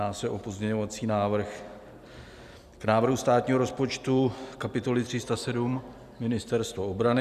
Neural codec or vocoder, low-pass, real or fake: none; 14.4 kHz; real